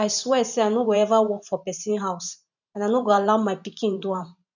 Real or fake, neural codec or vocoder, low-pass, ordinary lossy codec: fake; vocoder, 44.1 kHz, 128 mel bands every 512 samples, BigVGAN v2; 7.2 kHz; none